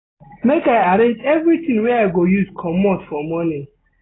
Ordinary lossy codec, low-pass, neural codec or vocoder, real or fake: AAC, 16 kbps; 7.2 kHz; none; real